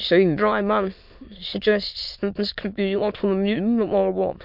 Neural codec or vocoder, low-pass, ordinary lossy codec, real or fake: autoencoder, 22.05 kHz, a latent of 192 numbers a frame, VITS, trained on many speakers; 5.4 kHz; MP3, 48 kbps; fake